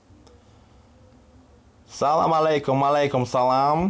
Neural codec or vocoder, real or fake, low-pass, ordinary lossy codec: none; real; none; none